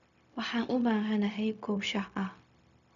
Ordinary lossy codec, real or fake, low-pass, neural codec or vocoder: none; fake; 7.2 kHz; codec, 16 kHz, 0.4 kbps, LongCat-Audio-Codec